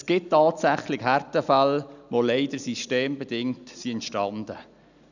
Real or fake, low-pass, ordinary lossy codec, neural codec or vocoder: real; 7.2 kHz; none; none